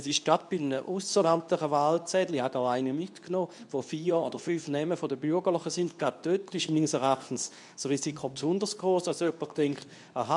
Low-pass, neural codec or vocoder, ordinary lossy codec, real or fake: 10.8 kHz; codec, 24 kHz, 0.9 kbps, WavTokenizer, medium speech release version 2; none; fake